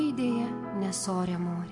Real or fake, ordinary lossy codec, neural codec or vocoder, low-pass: real; AAC, 64 kbps; none; 10.8 kHz